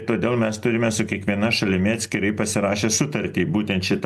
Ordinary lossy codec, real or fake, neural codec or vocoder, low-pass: AAC, 96 kbps; real; none; 14.4 kHz